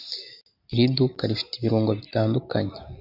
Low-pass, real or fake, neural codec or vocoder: 5.4 kHz; real; none